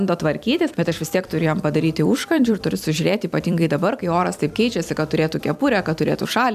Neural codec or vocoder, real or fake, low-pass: vocoder, 44.1 kHz, 128 mel bands every 256 samples, BigVGAN v2; fake; 14.4 kHz